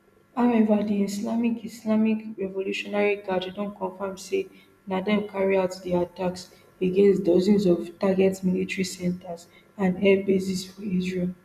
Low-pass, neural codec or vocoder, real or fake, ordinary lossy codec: 14.4 kHz; none; real; none